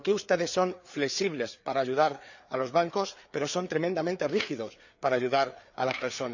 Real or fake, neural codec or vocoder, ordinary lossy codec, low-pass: fake; codec, 16 kHz, 4 kbps, FreqCodec, larger model; none; 7.2 kHz